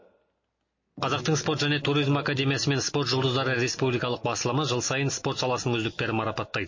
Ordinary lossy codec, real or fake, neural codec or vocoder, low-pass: MP3, 32 kbps; real; none; 7.2 kHz